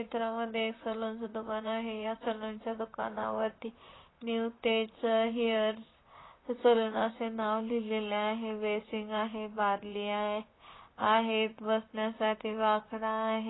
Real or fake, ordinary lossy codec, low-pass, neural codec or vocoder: fake; AAC, 16 kbps; 7.2 kHz; vocoder, 44.1 kHz, 128 mel bands, Pupu-Vocoder